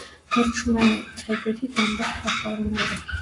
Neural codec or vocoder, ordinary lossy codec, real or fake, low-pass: codec, 44.1 kHz, 7.8 kbps, Pupu-Codec; AAC, 48 kbps; fake; 10.8 kHz